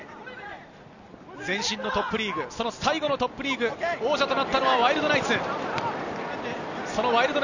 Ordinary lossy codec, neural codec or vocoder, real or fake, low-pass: none; none; real; 7.2 kHz